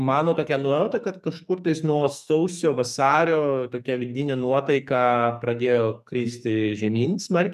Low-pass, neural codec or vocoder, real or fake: 14.4 kHz; codec, 44.1 kHz, 2.6 kbps, SNAC; fake